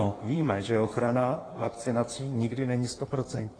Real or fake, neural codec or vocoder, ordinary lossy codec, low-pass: fake; codec, 16 kHz in and 24 kHz out, 1.1 kbps, FireRedTTS-2 codec; AAC, 32 kbps; 9.9 kHz